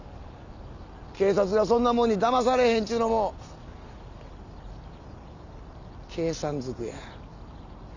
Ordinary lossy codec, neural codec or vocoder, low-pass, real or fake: none; none; 7.2 kHz; real